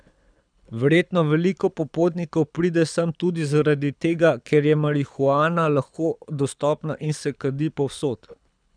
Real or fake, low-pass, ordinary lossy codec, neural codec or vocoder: fake; 9.9 kHz; none; codec, 24 kHz, 6 kbps, HILCodec